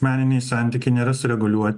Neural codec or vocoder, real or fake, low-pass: none; real; 10.8 kHz